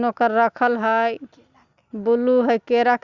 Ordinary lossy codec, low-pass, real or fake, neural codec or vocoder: Opus, 64 kbps; 7.2 kHz; real; none